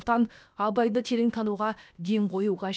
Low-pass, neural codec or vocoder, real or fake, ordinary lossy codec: none; codec, 16 kHz, about 1 kbps, DyCAST, with the encoder's durations; fake; none